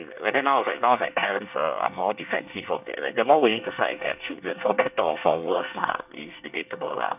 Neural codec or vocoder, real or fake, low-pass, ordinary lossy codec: codec, 24 kHz, 1 kbps, SNAC; fake; 3.6 kHz; none